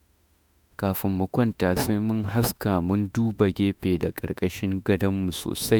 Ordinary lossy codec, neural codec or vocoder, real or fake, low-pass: none; autoencoder, 48 kHz, 32 numbers a frame, DAC-VAE, trained on Japanese speech; fake; none